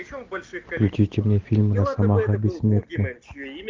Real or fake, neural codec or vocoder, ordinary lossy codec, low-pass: real; none; Opus, 24 kbps; 7.2 kHz